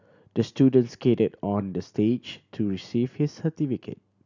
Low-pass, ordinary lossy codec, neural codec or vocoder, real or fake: 7.2 kHz; none; none; real